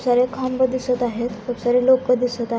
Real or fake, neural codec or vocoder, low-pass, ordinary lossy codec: real; none; none; none